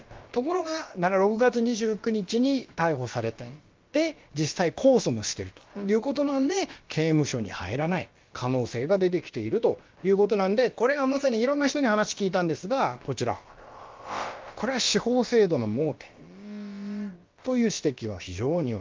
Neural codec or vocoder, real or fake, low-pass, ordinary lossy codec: codec, 16 kHz, about 1 kbps, DyCAST, with the encoder's durations; fake; 7.2 kHz; Opus, 32 kbps